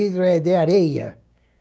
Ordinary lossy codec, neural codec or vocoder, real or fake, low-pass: none; codec, 16 kHz, 6 kbps, DAC; fake; none